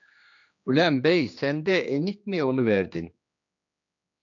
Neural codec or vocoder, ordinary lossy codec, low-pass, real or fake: codec, 16 kHz, 2 kbps, X-Codec, HuBERT features, trained on general audio; AAC, 64 kbps; 7.2 kHz; fake